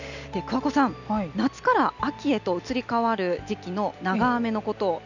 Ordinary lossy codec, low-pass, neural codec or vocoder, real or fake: none; 7.2 kHz; none; real